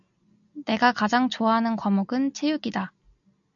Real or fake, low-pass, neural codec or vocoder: real; 7.2 kHz; none